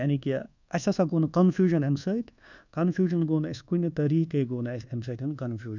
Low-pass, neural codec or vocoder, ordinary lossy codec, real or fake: 7.2 kHz; codec, 24 kHz, 1.2 kbps, DualCodec; none; fake